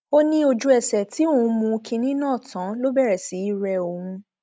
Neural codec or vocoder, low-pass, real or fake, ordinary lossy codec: none; none; real; none